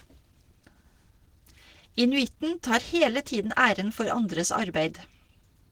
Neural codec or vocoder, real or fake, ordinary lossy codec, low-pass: none; real; Opus, 16 kbps; 19.8 kHz